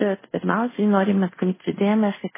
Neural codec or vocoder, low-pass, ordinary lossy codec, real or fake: codec, 24 kHz, 0.5 kbps, DualCodec; 3.6 kHz; MP3, 16 kbps; fake